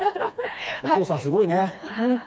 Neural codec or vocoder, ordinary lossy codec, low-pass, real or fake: codec, 16 kHz, 2 kbps, FreqCodec, smaller model; none; none; fake